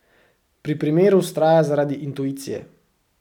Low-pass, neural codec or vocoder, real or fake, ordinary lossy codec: 19.8 kHz; none; real; none